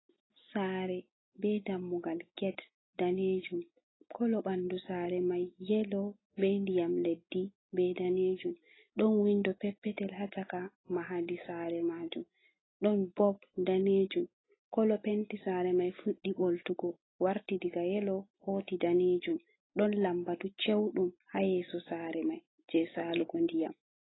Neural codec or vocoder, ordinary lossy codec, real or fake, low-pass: none; AAC, 16 kbps; real; 7.2 kHz